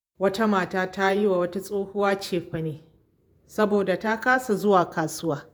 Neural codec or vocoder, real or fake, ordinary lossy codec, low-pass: vocoder, 48 kHz, 128 mel bands, Vocos; fake; none; none